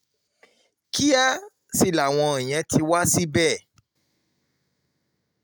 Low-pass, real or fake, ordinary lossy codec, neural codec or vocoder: none; real; none; none